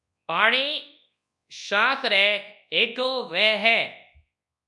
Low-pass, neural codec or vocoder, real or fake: 10.8 kHz; codec, 24 kHz, 0.9 kbps, WavTokenizer, large speech release; fake